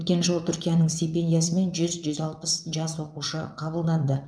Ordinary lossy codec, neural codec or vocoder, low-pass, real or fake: none; vocoder, 22.05 kHz, 80 mel bands, WaveNeXt; none; fake